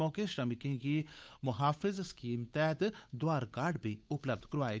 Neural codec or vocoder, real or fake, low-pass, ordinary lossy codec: codec, 16 kHz, 8 kbps, FunCodec, trained on Chinese and English, 25 frames a second; fake; none; none